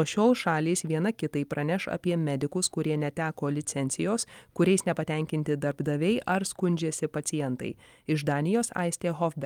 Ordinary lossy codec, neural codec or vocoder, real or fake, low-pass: Opus, 32 kbps; vocoder, 44.1 kHz, 128 mel bands every 256 samples, BigVGAN v2; fake; 19.8 kHz